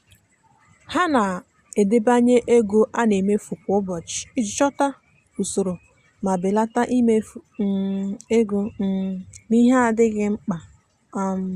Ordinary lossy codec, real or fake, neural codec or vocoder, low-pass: Opus, 64 kbps; real; none; 14.4 kHz